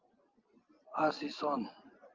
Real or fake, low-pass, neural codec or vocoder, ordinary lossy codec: real; 7.2 kHz; none; Opus, 24 kbps